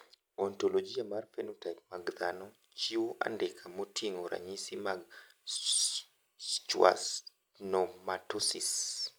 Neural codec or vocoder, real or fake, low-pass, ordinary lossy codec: none; real; none; none